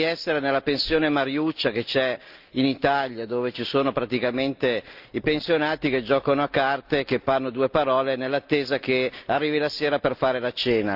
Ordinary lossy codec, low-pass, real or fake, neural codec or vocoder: Opus, 24 kbps; 5.4 kHz; real; none